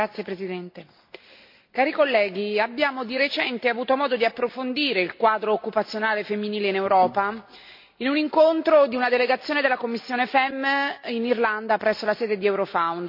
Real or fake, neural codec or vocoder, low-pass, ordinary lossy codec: real; none; 5.4 kHz; MP3, 32 kbps